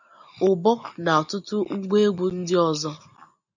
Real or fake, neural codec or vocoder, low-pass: real; none; 7.2 kHz